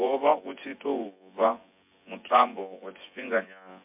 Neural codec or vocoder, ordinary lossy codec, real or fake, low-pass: vocoder, 24 kHz, 100 mel bands, Vocos; MP3, 32 kbps; fake; 3.6 kHz